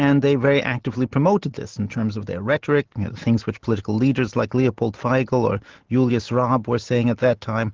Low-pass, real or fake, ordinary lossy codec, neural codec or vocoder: 7.2 kHz; real; Opus, 16 kbps; none